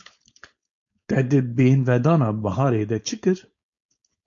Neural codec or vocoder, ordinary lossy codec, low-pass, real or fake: codec, 16 kHz, 4.8 kbps, FACodec; MP3, 48 kbps; 7.2 kHz; fake